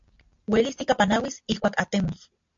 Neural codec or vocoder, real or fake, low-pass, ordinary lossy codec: none; real; 7.2 kHz; MP3, 48 kbps